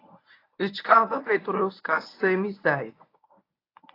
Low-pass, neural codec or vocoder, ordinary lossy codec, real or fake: 5.4 kHz; codec, 24 kHz, 0.9 kbps, WavTokenizer, medium speech release version 1; AAC, 24 kbps; fake